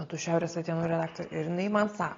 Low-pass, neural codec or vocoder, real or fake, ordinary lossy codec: 7.2 kHz; none; real; AAC, 32 kbps